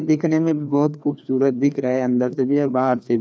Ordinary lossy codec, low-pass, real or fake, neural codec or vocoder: none; none; fake; codec, 16 kHz, 2 kbps, FreqCodec, larger model